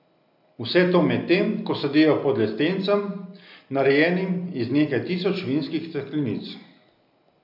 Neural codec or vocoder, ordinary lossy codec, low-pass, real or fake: none; none; 5.4 kHz; real